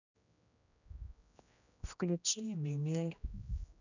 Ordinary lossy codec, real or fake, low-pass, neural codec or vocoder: none; fake; 7.2 kHz; codec, 16 kHz, 1 kbps, X-Codec, HuBERT features, trained on general audio